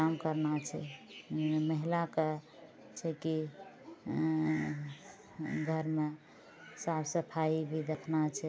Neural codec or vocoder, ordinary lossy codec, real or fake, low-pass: none; none; real; none